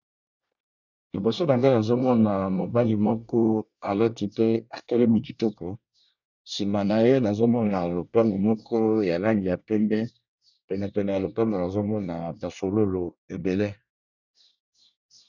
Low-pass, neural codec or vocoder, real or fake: 7.2 kHz; codec, 24 kHz, 1 kbps, SNAC; fake